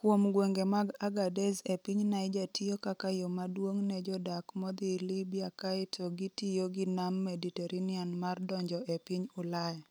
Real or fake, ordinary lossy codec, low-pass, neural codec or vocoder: real; none; none; none